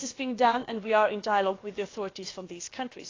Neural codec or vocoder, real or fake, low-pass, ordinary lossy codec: codec, 16 kHz, about 1 kbps, DyCAST, with the encoder's durations; fake; 7.2 kHz; AAC, 32 kbps